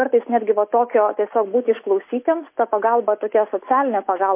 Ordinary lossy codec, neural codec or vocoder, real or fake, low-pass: MP3, 24 kbps; none; real; 3.6 kHz